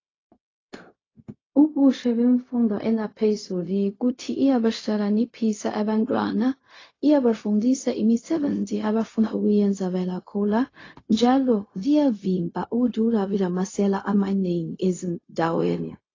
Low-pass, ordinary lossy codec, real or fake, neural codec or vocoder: 7.2 kHz; AAC, 32 kbps; fake; codec, 16 kHz, 0.4 kbps, LongCat-Audio-Codec